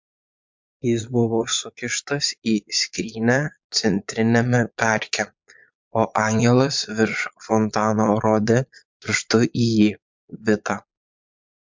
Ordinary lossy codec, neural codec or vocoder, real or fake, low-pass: MP3, 64 kbps; vocoder, 22.05 kHz, 80 mel bands, Vocos; fake; 7.2 kHz